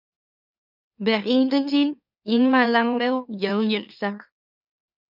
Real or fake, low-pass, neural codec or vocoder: fake; 5.4 kHz; autoencoder, 44.1 kHz, a latent of 192 numbers a frame, MeloTTS